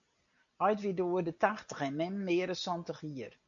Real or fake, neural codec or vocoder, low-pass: real; none; 7.2 kHz